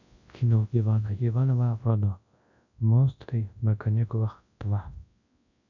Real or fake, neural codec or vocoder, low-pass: fake; codec, 24 kHz, 0.9 kbps, WavTokenizer, large speech release; 7.2 kHz